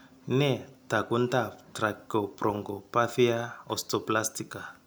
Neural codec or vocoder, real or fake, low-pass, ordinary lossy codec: none; real; none; none